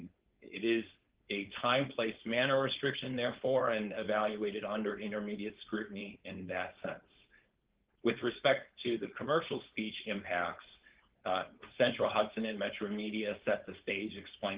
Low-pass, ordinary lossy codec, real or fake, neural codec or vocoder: 3.6 kHz; Opus, 16 kbps; fake; codec, 16 kHz, 4.8 kbps, FACodec